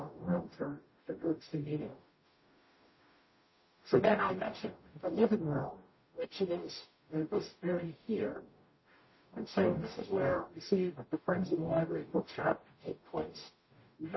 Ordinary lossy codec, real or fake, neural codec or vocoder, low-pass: MP3, 24 kbps; fake; codec, 44.1 kHz, 0.9 kbps, DAC; 7.2 kHz